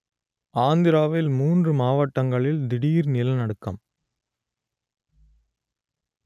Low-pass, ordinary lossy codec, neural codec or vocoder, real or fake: 14.4 kHz; none; none; real